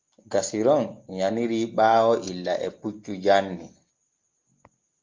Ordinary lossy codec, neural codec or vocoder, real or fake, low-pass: Opus, 32 kbps; none; real; 7.2 kHz